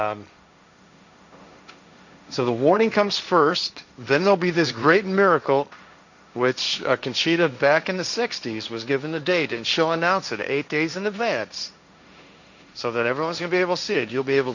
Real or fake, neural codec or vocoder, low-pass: fake; codec, 16 kHz, 1.1 kbps, Voila-Tokenizer; 7.2 kHz